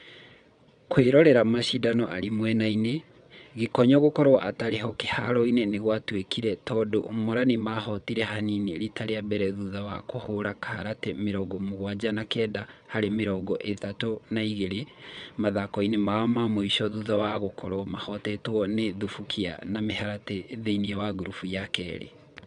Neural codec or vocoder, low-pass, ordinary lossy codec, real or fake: vocoder, 22.05 kHz, 80 mel bands, WaveNeXt; 9.9 kHz; none; fake